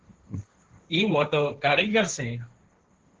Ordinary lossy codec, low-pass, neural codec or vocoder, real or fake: Opus, 16 kbps; 7.2 kHz; codec, 16 kHz, 1.1 kbps, Voila-Tokenizer; fake